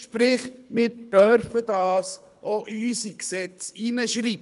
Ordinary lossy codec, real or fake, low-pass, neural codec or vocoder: none; fake; 10.8 kHz; codec, 24 kHz, 3 kbps, HILCodec